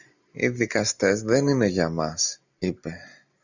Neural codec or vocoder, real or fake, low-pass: none; real; 7.2 kHz